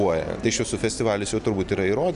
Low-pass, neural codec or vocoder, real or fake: 10.8 kHz; none; real